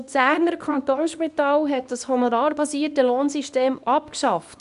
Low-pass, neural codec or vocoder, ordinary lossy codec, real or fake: 10.8 kHz; codec, 24 kHz, 0.9 kbps, WavTokenizer, small release; none; fake